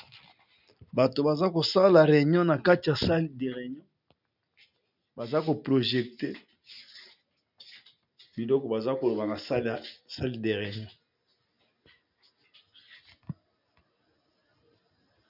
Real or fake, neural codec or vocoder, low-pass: real; none; 5.4 kHz